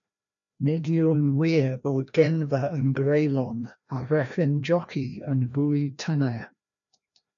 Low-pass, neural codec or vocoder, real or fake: 7.2 kHz; codec, 16 kHz, 1 kbps, FreqCodec, larger model; fake